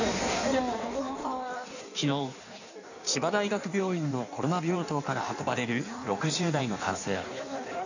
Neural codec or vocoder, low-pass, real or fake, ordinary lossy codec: codec, 16 kHz in and 24 kHz out, 1.1 kbps, FireRedTTS-2 codec; 7.2 kHz; fake; none